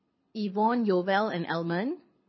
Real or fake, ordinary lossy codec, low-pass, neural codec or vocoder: fake; MP3, 24 kbps; 7.2 kHz; codec, 24 kHz, 6 kbps, HILCodec